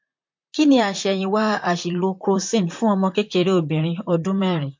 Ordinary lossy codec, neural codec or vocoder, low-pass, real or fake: MP3, 48 kbps; vocoder, 44.1 kHz, 128 mel bands, Pupu-Vocoder; 7.2 kHz; fake